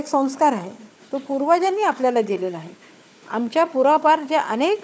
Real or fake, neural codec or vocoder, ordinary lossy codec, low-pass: fake; codec, 16 kHz, 4 kbps, FunCodec, trained on Chinese and English, 50 frames a second; none; none